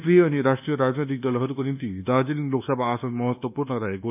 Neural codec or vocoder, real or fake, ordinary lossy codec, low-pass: codec, 24 kHz, 1.2 kbps, DualCodec; fake; none; 3.6 kHz